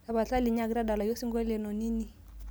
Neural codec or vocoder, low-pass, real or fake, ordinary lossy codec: none; none; real; none